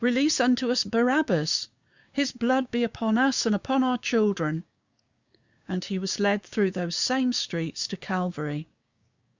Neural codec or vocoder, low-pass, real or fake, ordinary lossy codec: codec, 16 kHz, 6 kbps, DAC; 7.2 kHz; fake; Opus, 64 kbps